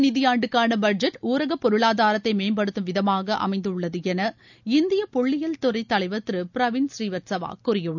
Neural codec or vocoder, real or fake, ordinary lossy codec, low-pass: none; real; none; 7.2 kHz